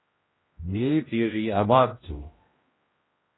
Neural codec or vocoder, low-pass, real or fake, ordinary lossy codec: codec, 16 kHz, 0.5 kbps, X-Codec, HuBERT features, trained on general audio; 7.2 kHz; fake; AAC, 16 kbps